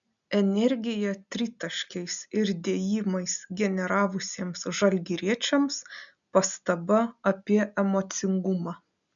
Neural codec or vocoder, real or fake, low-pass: none; real; 7.2 kHz